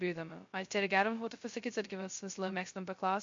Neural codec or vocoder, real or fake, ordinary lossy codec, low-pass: codec, 16 kHz, 0.2 kbps, FocalCodec; fake; MP3, 48 kbps; 7.2 kHz